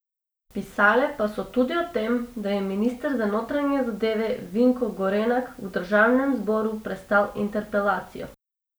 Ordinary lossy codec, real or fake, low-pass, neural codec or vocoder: none; real; none; none